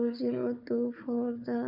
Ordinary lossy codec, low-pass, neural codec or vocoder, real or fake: none; 5.4 kHz; codec, 16 kHz, 4 kbps, FunCodec, trained on Chinese and English, 50 frames a second; fake